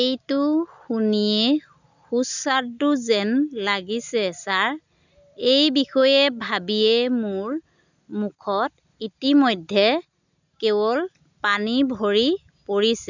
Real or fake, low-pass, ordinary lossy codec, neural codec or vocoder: real; 7.2 kHz; none; none